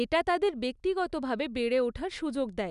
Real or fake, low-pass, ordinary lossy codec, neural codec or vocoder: real; 10.8 kHz; none; none